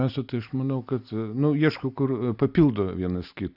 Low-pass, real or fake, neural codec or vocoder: 5.4 kHz; real; none